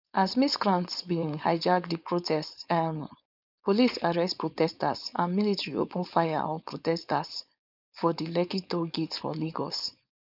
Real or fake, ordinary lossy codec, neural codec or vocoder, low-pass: fake; none; codec, 16 kHz, 4.8 kbps, FACodec; 5.4 kHz